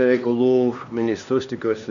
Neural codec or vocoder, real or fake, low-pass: codec, 16 kHz, 2 kbps, X-Codec, HuBERT features, trained on LibriSpeech; fake; 7.2 kHz